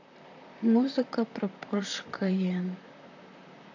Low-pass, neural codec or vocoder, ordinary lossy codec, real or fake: 7.2 kHz; vocoder, 44.1 kHz, 128 mel bands, Pupu-Vocoder; AAC, 48 kbps; fake